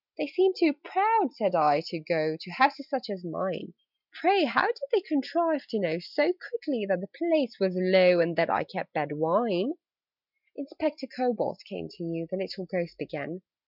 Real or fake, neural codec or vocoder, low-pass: real; none; 5.4 kHz